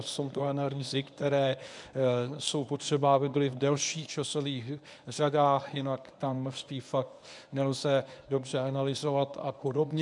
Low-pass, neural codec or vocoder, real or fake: 10.8 kHz; codec, 24 kHz, 0.9 kbps, WavTokenizer, medium speech release version 1; fake